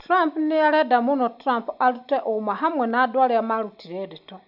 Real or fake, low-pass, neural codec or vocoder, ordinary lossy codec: real; 5.4 kHz; none; none